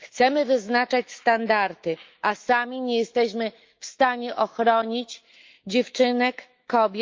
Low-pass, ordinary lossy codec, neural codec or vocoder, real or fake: 7.2 kHz; Opus, 32 kbps; autoencoder, 48 kHz, 128 numbers a frame, DAC-VAE, trained on Japanese speech; fake